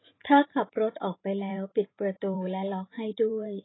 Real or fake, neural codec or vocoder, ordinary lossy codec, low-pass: fake; vocoder, 44.1 kHz, 128 mel bands every 512 samples, BigVGAN v2; AAC, 16 kbps; 7.2 kHz